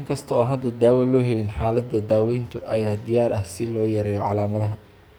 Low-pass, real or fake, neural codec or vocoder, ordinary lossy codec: none; fake; codec, 44.1 kHz, 2.6 kbps, SNAC; none